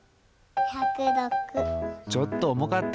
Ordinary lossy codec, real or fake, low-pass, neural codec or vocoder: none; real; none; none